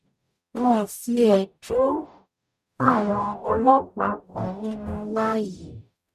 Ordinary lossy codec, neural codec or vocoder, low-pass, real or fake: MP3, 96 kbps; codec, 44.1 kHz, 0.9 kbps, DAC; 14.4 kHz; fake